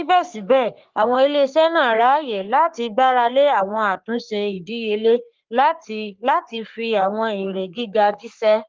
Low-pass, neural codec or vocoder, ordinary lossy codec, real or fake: 7.2 kHz; codec, 44.1 kHz, 3.4 kbps, Pupu-Codec; Opus, 32 kbps; fake